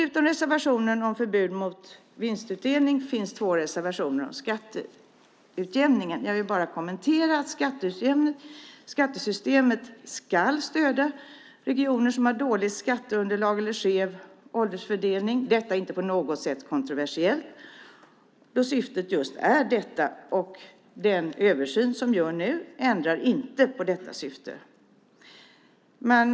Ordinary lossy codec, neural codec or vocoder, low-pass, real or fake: none; none; none; real